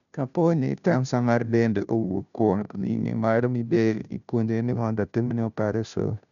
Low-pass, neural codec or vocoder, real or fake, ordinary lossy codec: 7.2 kHz; codec, 16 kHz, 0.5 kbps, FunCodec, trained on Chinese and English, 25 frames a second; fake; none